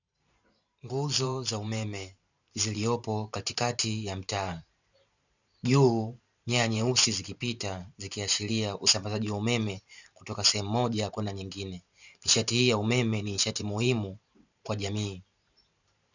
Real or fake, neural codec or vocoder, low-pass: fake; vocoder, 44.1 kHz, 128 mel bands every 512 samples, BigVGAN v2; 7.2 kHz